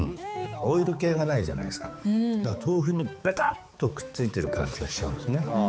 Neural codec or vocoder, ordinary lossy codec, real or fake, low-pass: codec, 16 kHz, 4 kbps, X-Codec, HuBERT features, trained on balanced general audio; none; fake; none